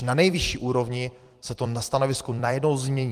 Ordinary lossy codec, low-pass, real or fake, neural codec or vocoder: Opus, 24 kbps; 14.4 kHz; fake; vocoder, 44.1 kHz, 128 mel bands every 256 samples, BigVGAN v2